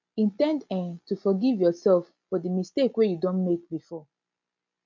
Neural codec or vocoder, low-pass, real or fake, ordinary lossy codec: none; 7.2 kHz; real; MP3, 64 kbps